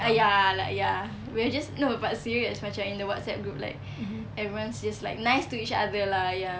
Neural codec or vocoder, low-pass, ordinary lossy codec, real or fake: none; none; none; real